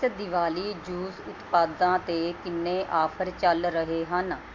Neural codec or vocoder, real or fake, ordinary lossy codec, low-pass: none; real; MP3, 48 kbps; 7.2 kHz